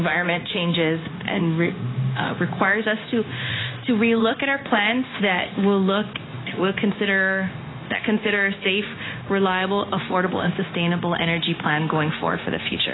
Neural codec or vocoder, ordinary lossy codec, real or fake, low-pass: codec, 16 kHz, 0.9 kbps, LongCat-Audio-Codec; AAC, 16 kbps; fake; 7.2 kHz